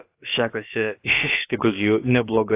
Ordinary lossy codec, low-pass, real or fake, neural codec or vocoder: AAC, 24 kbps; 3.6 kHz; fake; codec, 16 kHz, about 1 kbps, DyCAST, with the encoder's durations